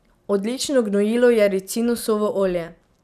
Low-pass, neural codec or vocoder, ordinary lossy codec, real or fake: 14.4 kHz; none; none; real